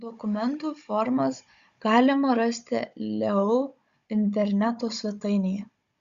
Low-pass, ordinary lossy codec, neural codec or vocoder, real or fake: 7.2 kHz; Opus, 64 kbps; codec, 16 kHz, 16 kbps, FunCodec, trained on Chinese and English, 50 frames a second; fake